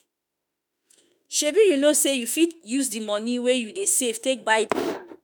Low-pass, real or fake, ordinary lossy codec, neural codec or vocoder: none; fake; none; autoencoder, 48 kHz, 32 numbers a frame, DAC-VAE, trained on Japanese speech